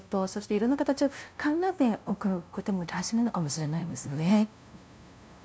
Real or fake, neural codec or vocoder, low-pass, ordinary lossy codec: fake; codec, 16 kHz, 0.5 kbps, FunCodec, trained on LibriTTS, 25 frames a second; none; none